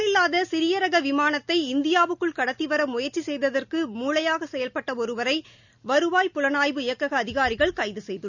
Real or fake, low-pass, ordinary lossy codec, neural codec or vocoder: real; 7.2 kHz; none; none